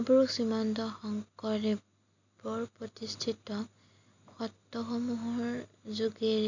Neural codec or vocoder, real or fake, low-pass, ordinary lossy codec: none; real; 7.2 kHz; none